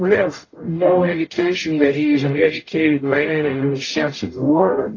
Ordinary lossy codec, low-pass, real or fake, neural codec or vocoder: AAC, 32 kbps; 7.2 kHz; fake; codec, 44.1 kHz, 0.9 kbps, DAC